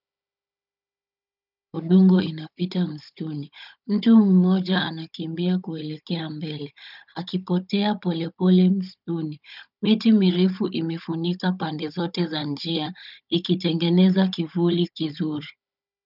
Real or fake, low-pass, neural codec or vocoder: fake; 5.4 kHz; codec, 16 kHz, 16 kbps, FunCodec, trained on Chinese and English, 50 frames a second